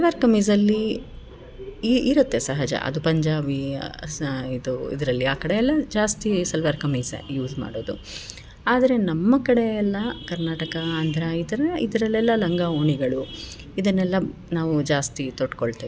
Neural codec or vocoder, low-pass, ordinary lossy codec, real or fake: none; none; none; real